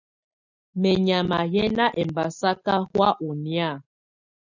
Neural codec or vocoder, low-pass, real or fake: none; 7.2 kHz; real